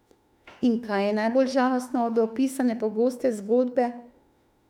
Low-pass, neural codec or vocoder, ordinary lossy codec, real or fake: 19.8 kHz; autoencoder, 48 kHz, 32 numbers a frame, DAC-VAE, trained on Japanese speech; none; fake